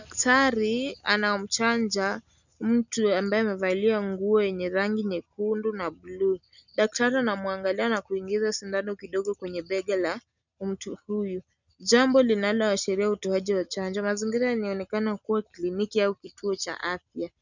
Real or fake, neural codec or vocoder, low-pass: real; none; 7.2 kHz